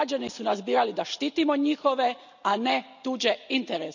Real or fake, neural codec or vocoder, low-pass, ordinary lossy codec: real; none; 7.2 kHz; none